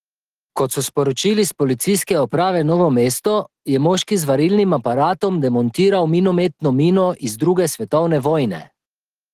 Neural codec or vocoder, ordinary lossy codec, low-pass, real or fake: none; Opus, 16 kbps; 14.4 kHz; real